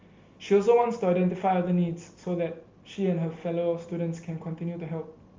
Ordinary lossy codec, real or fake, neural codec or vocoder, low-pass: Opus, 32 kbps; real; none; 7.2 kHz